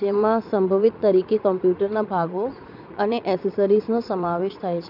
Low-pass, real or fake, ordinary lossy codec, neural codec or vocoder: 5.4 kHz; fake; none; vocoder, 44.1 kHz, 80 mel bands, Vocos